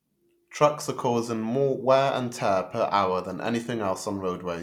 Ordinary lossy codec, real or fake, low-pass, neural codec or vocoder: none; real; 19.8 kHz; none